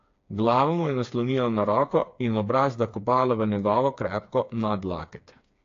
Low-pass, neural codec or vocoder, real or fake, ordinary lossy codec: 7.2 kHz; codec, 16 kHz, 4 kbps, FreqCodec, smaller model; fake; AAC, 48 kbps